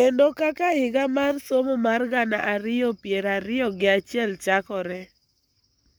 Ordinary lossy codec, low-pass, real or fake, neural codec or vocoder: none; none; fake; codec, 44.1 kHz, 7.8 kbps, Pupu-Codec